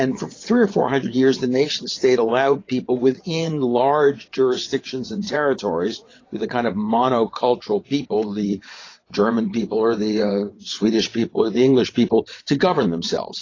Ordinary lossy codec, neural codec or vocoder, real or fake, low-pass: AAC, 32 kbps; vocoder, 22.05 kHz, 80 mel bands, WaveNeXt; fake; 7.2 kHz